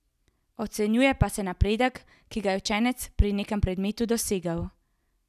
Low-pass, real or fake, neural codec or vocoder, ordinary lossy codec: 14.4 kHz; real; none; none